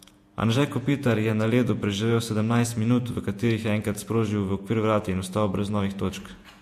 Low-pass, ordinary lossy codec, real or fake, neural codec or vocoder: 14.4 kHz; MP3, 64 kbps; fake; vocoder, 48 kHz, 128 mel bands, Vocos